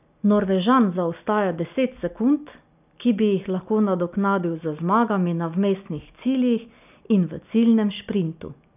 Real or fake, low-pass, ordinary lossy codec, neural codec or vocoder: real; 3.6 kHz; none; none